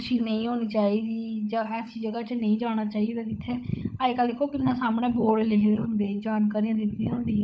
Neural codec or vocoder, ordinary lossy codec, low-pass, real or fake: codec, 16 kHz, 16 kbps, FunCodec, trained on LibriTTS, 50 frames a second; none; none; fake